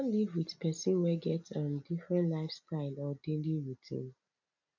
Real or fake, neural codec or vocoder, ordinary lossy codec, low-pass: real; none; none; 7.2 kHz